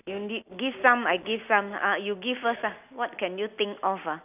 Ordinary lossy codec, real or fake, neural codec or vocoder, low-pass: none; real; none; 3.6 kHz